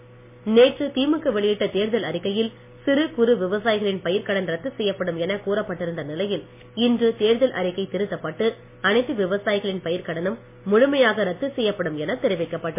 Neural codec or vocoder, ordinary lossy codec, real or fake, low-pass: none; MP3, 24 kbps; real; 3.6 kHz